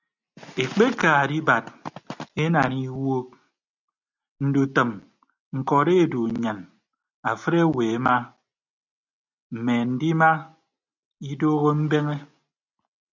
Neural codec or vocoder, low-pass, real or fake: none; 7.2 kHz; real